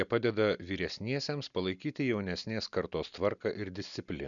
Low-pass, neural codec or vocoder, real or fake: 7.2 kHz; none; real